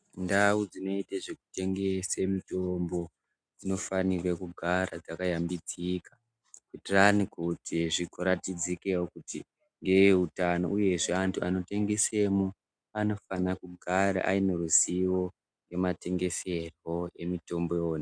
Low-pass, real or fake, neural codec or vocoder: 9.9 kHz; real; none